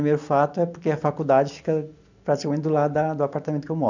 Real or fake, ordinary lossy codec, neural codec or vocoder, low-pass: real; none; none; 7.2 kHz